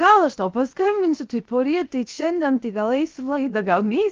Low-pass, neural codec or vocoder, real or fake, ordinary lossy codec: 7.2 kHz; codec, 16 kHz, 0.7 kbps, FocalCodec; fake; Opus, 24 kbps